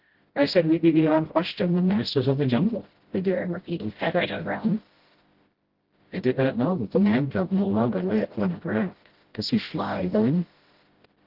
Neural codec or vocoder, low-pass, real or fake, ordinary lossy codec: codec, 16 kHz, 0.5 kbps, FreqCodec, smaller model; 5.4 kHz; fake; Opus, 16 kbps